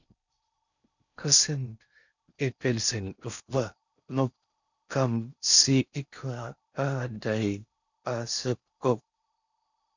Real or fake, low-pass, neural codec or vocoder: fake; 7.2 kHz; codec, 16 kHz in and 24 kHz out, 0.6 kbps, FocalCodec, streaming, 4096 codes